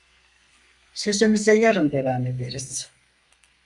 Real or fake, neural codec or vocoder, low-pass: fake; codec, 32 kHz, 1.9 kbps, SNAC; 10.8 kHz